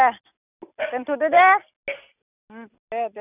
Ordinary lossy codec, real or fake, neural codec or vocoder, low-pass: none; real; none; 3.6 kHz